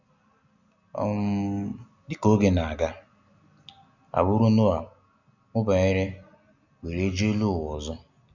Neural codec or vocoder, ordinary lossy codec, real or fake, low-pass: none; none; real; 7.2 kHz